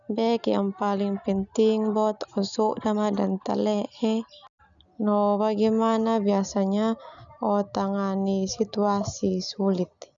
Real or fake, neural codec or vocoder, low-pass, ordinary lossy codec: real; none; 7.2 kHz; none